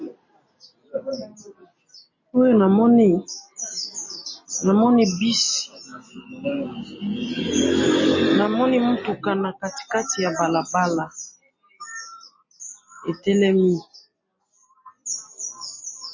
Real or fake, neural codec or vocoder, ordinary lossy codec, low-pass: real; none; MP3, 32 kbps; 7.2 kHz